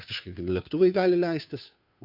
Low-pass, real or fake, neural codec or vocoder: 5.4 kHz; fake; codec, 16 kHz, 2 kbps, FunCodec, trained on Chinese and English, 25 frames a second